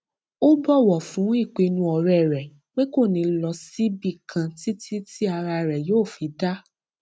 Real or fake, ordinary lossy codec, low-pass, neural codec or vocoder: real; none; none; none